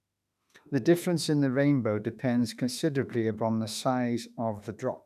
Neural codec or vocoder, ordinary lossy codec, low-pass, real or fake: autoencoder, 48 kHz, 32 numbers a frame, DAC-VAE, trained on Japanese speech; none; 14.4 kHz; fake